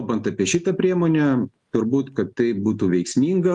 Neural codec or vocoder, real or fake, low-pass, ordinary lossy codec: none; real; 10.8 kHz; Opus, 32 kbps